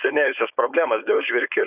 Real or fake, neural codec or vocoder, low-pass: fake; codec, 16 kHz, 4.8 kbps, FACodec; 3.6 kHz